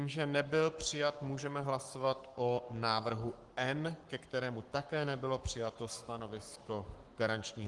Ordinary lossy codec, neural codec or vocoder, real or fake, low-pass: Opus, 24 kbps; codec, 44.1 kHz, 7.8 kbps, Pupu-Codec; fake; 10.8 kHz